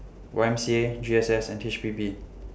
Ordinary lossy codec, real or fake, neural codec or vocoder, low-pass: none; real; none; none